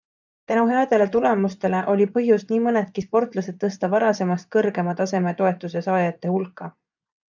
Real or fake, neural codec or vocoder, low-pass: fake; vocoder, 24 kHz, 100 mel bands, Vocos; 7.2 kHz